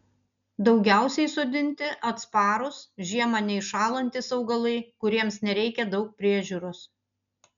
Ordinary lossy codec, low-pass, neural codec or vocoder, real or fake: MP3, 96 kbps; 7.2 kHz; none; real